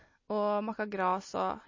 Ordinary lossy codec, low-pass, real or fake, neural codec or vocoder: MP3, 32 kbps; 7.2 kHz; real; none